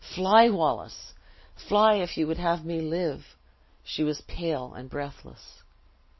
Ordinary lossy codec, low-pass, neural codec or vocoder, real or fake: MP3, 24 kbps; 7.2 kHz; none; real